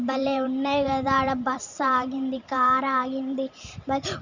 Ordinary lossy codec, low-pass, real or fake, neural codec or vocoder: Opus, 64 kbps; 7.2 kHz; fake; vocoder, 44.1 kHz, 128 mel bands every 512 samples, BigVGAN v2